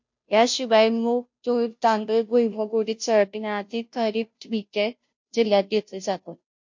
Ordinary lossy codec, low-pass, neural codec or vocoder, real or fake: MP3, 48 kbps; 7.2 kHz; codec, 16 kHz, 0.5 kbps, FunCodec, trained on Chinese and English, 25 frames a second; fake